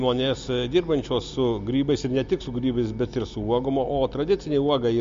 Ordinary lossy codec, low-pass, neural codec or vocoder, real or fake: MP3, 48 kbps; 7.2 kHz; none; real